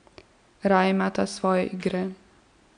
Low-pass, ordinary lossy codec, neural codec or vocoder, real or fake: 9.9 kHz; none; none; real